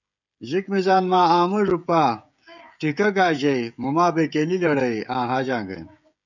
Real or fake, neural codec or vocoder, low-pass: fake; codec, 16 kHz, 16 kbps, FreqCodec, smaller model; 7.2 kHz